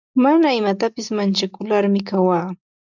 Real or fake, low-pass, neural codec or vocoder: real; 7.2 kHz; none